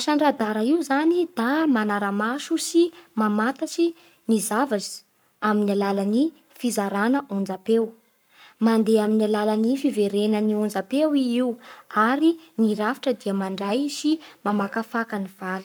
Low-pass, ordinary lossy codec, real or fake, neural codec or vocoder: none; none; fake; codec, 44.1 kHz, 7.8 kbps, Pupu-Codec